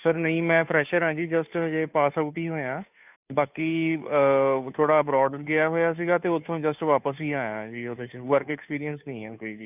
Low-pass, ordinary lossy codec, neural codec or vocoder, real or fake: 3.6 kHz; none; codec, 16 kHz, 2 kbps, FunCodec, trained on Chinese and English, 25 frames a second; fake